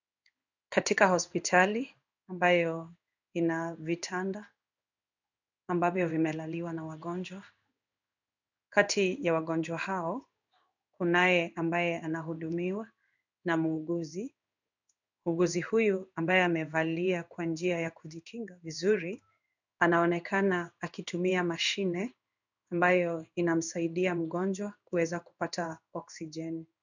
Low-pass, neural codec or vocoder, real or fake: 7.2 kHz; codec, 16 kHz in and 24 kHz out, 1 kbps, XY-Tokenizer; fake